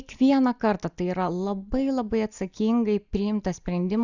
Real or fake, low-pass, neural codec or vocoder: real; 7.2 kHz; none